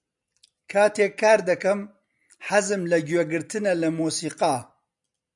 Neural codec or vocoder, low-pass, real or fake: none; 10.8 kHz; real